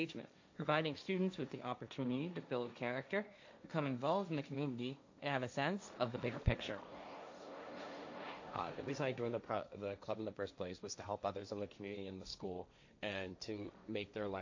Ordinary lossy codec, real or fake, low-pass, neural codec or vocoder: AAC, 48 kbps; fake; 7.2 kHz; codec, 16 kHz, 1.1 kbps, Voila-Tokenizer